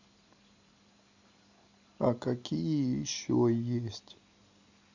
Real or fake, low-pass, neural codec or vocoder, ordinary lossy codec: real; 7.2 kHz; none; Opus, 64 kbps